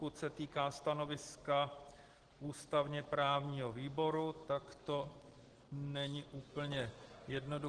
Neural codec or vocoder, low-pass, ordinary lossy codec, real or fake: none; 9.9 kHz; Opus, 16 kbps; real